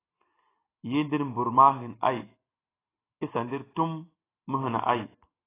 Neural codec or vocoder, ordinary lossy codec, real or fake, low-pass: none; AAC, 16 kbps; real; 3.6 kHz